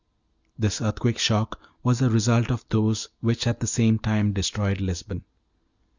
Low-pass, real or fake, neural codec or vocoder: 7.2 kHz; real; none